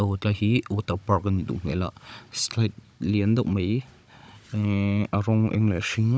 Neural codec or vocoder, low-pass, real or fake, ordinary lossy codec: codec, 16 kHz, 16 kbps, FunCodec, trained on Chinese and English, 50 frames a second; none; fake; none